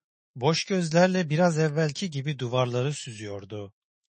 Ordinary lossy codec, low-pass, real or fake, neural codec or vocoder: MP3, 32 kbps; 10.8 kHz; fake; autoencoder, 48 kHz, 128 numbers a frame, DAC-VAE, trained on Japanese speech